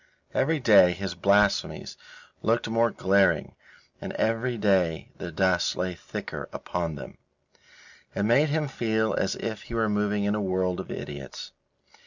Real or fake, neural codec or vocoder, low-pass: real; none; 7.2 kHz